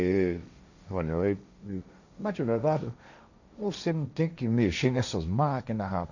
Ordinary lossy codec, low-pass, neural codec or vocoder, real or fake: none; 7.2 kHz; codec, 16 kHz, 1.1 kbps, Voila-Tokenizer; fake